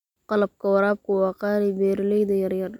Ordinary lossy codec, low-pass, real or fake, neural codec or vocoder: none; 19.8 kHz; real; none